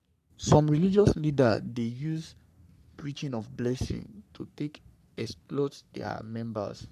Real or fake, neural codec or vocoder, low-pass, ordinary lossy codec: fake; codec, 44.1 kHz, 3.4 kbps, Pupu-Codec; 14.4 kHz; none